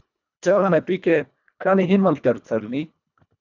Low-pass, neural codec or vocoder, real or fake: 7.2 kHz; codec, 24 kHz, 1.5 kbps, HILCodec; fake